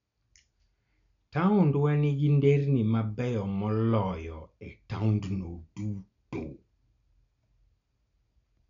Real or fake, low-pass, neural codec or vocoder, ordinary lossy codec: real; 7.2 kHz; none; none